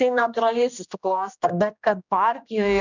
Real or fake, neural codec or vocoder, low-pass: fake; codec, 16 kHz, 1 kbps, X-Codec, HuBERT features, trained on general audio; 7.2 kHz